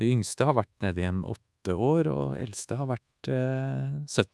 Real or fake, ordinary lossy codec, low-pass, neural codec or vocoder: fake; none; none; codec, 24 kHz, 1.2 kbps, DualCodec